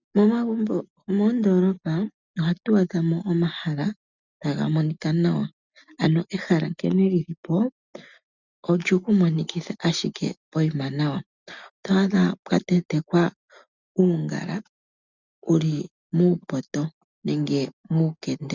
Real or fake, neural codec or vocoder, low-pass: real; none; 7.2 kHz